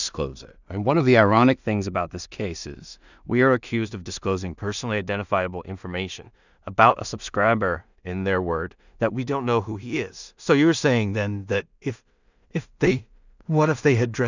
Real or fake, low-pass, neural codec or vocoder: fake; 7.2 kHz; codec, 16 kHz in and 24 kHz out, 0.4 kbps, LongCat-Audio-Codec, two codebook decoder